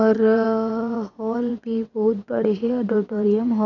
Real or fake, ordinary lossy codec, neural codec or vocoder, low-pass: fake; none; vocoder, 22.05 kHz, 80 mel bands, WaveNeXt; 7.2 kHz